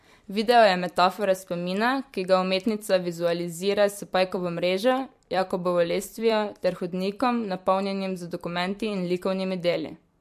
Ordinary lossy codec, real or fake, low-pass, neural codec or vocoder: MP3, 64 kbps; fake; 14.4 kHz; vocoder, 44.1 kHz, 128 mel bands every 256 samples, BigVGAN v2